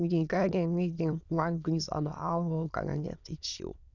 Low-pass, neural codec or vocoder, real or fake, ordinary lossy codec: 7.2 kHz; autoencoder, 22.05 kHz, a latent of 192 numbers a frame, VITS, trained on many speakers; fake; none